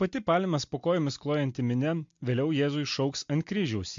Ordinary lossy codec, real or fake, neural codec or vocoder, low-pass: MP3, 48 kbps; real; none; 7.2 kHz